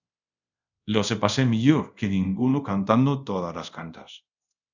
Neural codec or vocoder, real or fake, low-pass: codec, 24 kHz, 0.5 kbps, DualCodec; fake; 7.2 kHz